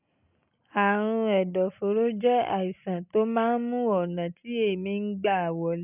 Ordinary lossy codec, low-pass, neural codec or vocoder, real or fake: none; 3.6 kHz; none; real